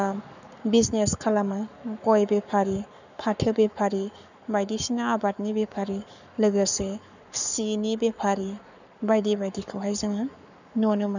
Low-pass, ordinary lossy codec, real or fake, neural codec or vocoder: 7.2 kHz; none; fake; codec, 44.1 kHz, 7.8 kbps, Pupu-Codec